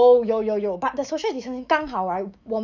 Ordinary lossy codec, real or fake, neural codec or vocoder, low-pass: none; fake; autoencoder, 48 kHz, 128 numbers a frame, DAC-VAE, trained on Japanese speech; 7.2 kHz